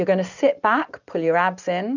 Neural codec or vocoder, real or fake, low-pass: none; real; 7.2 kHz